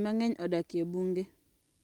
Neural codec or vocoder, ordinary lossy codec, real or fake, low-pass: none; Opus, 32 kbps; real; 19.8 kHz